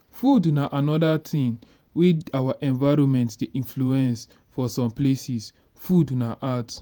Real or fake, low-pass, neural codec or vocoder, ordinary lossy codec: fake; none; vocoder, 48 kHz, 128 mel bands, Vocos; none